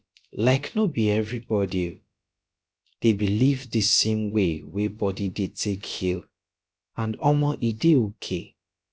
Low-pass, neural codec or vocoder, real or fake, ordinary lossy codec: none; codec, 16 kHz, about 1 kbps, DyCAST, with the encoder's durations; fake; none